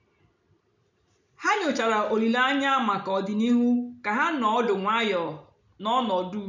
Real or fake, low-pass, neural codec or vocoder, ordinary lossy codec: real; 7.2 kHz; none; none